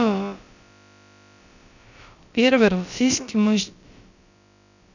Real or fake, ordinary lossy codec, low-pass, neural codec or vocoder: fake; none; 7.2 kHz; codec, 16 kHz, about 1 kbps, DyCAST, with the encoder's durations